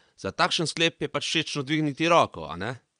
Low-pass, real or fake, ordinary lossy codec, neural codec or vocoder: 9.9 kHz; fake; none; vocoder, 22.05 kHz, 80 mel bands, Vocos